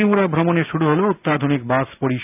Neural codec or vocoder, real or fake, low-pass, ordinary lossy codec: none; real; 3.6 kHz; none